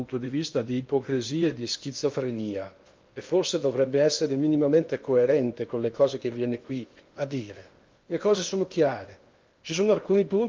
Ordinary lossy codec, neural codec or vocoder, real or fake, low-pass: Opus, 24 kbps; codec, 16 kHz in and 24 kHz out, 0.8 kbps, FocalCodec, streaming, 65536 codes; fake; 7.2 kHz